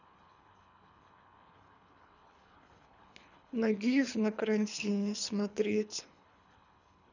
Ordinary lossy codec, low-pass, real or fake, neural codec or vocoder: none; 7.2 kHz; fake; codec, 24 kHz, 3 kbps, HILCodec